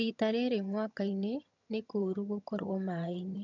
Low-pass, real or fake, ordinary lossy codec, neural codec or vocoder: 7.2 kHz; fake; none; vocoder, 22.05 kHz, 80 mel bands, HiFi-GAN